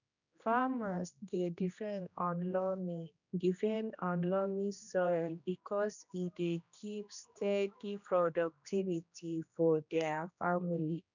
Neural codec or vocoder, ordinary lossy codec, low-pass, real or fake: codec, 16 kHz, 1 kbps, X-Codec, HuBERT features, trained on general audio; none; 7.2 kHz; fake